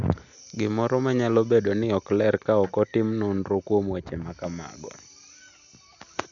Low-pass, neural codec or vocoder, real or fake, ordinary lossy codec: 7.2 kHz; none; real; none